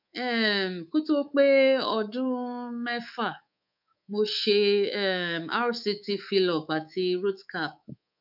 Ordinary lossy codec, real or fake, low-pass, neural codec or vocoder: none; fake; 5.4 kHz; codec, 24 kHz, 3.1 kbps, DualCodec